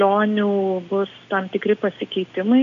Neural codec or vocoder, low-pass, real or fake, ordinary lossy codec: none; 7.2 kHz; real; AAC, 96 kbps